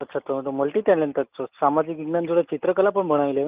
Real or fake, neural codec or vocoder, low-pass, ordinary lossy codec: real; none; 3.6 kHz; Opus, 24 kbps